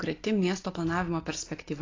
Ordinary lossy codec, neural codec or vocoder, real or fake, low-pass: AAC, 32 kbps; none; real; 7.2 kHz